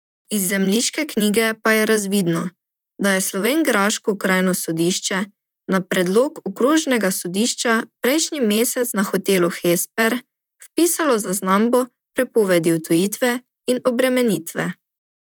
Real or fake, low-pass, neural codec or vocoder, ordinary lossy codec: fake; none; vocoder, 44.1 kHz, 128 mel bands, Pupu-Vocoder; none